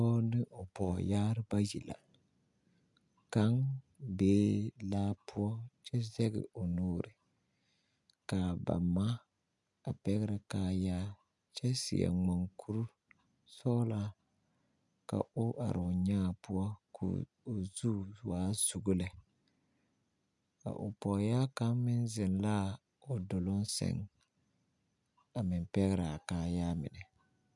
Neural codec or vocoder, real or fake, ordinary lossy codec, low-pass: none; real; MP3, 96 kbps; 10.8 kHz